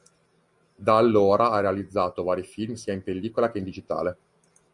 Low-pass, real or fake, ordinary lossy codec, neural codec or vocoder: 10.8 kHz; real; Opus, 64 kbps; none